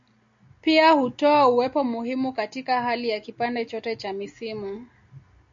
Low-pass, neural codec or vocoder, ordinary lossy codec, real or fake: 7.2 kHz; none; MP3, 48 kbps; real